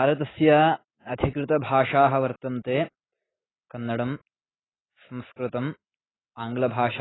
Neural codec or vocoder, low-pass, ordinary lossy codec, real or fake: none; 7.2 kHz; AAC, 16 kbps; real